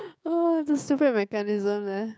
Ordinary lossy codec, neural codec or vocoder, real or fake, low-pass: none; codec, 16 kHz, 6 kbps, DAC; fake; none